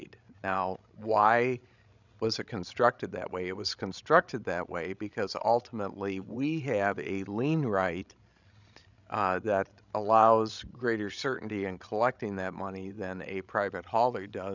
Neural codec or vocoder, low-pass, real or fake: codec, 16 kHz, 16 kbps, FreqCodec, larger model; 7.2 kHz; fake